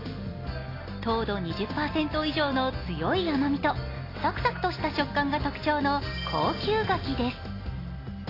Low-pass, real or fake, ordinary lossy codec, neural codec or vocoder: 5.4 kHz; real; AAC, 24 kbps; none